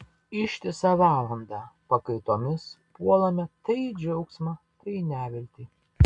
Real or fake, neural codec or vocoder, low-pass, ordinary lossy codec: real; none; 10.8 kHz; MP3, 64 kbps